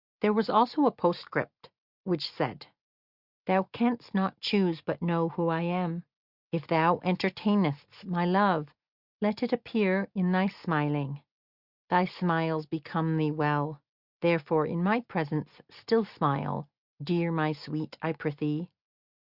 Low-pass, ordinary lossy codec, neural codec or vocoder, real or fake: 5.4 kHz; Opus, 64 kbps; none; real